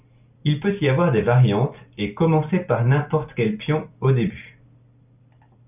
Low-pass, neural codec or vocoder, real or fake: 3.6 kHz; none; real